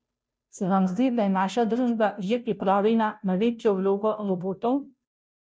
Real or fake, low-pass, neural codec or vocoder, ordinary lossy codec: fake; none; codec, 16 kHz, 0.5 kbps, FunCodec, trained on Chinese and English, 25 frames a second; none